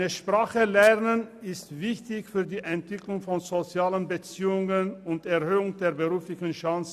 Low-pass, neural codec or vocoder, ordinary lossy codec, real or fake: 14.4 kHz; none; none; real